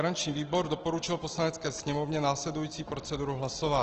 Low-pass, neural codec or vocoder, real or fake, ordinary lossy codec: 7.2 kHz; none; real; Opus, 16 kbps